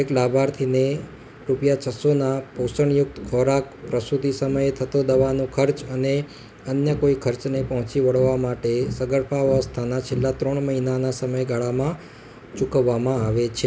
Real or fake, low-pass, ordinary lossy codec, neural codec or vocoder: real; none; none; none